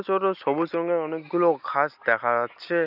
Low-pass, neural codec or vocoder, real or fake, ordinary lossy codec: 5.4 kHz; none; real; none